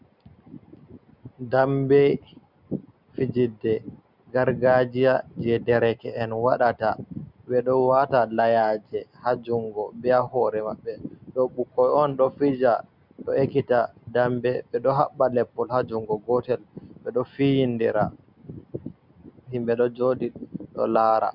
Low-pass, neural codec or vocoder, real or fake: 5.4 kHz; none; real